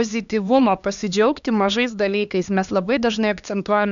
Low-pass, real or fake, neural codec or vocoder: 7.2 kHz; fake; codec, 16 kHz, 2 kbps, X-Codec, HuBERT features, trained on LibriSpeech